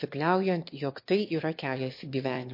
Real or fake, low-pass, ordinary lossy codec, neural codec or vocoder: fake; 5.4 kHz; MP3, 32 kbps; autoencoder, 22.05 kHz, a latent of 192 numbers a frame, VITS, trained on one speaker